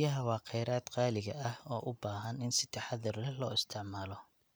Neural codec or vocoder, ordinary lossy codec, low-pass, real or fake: none; none; none; real